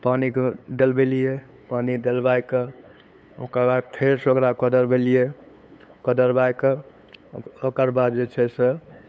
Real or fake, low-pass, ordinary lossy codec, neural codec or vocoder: fake; none; none; codec, 16 kHz, 8 kbps, FunCodec, trained on LibriTTS, 25 frames a second